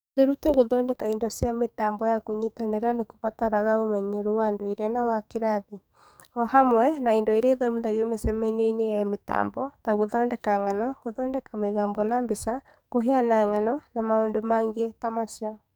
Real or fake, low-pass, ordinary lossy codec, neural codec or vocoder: fake; none; none; codec, 44.1 kHz, 2.6 kbps, SNAC